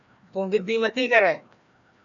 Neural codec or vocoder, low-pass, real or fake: codec, 16 kHz, 1 kbps, FreqCodec, larger model; 7.2 kHz; fake